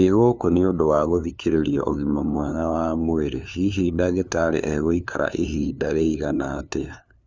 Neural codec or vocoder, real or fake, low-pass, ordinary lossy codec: codec, 16 kHz, 2 kbps, FunCodec, trained on LibriTTS, 25 frames a second; fake; none; none